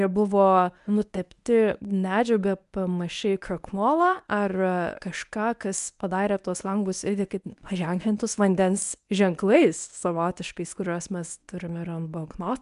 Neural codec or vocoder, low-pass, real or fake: codec, 24 kHz, 0.9 kbps, WavTokenizer, medium speech release version 1; 10.8 kHz; fake